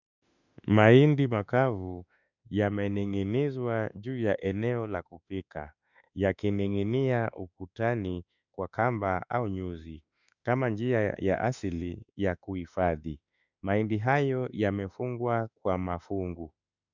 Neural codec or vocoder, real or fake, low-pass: autoencoder, 48 kHz, 32 numbers a frame, DAC-VAE, trained on Japanese speech; fake; 7.2 kHz